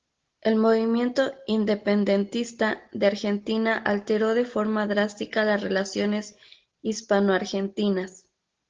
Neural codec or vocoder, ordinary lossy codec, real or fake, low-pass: none; Opus, 16 kbps; real; 7.2 kHz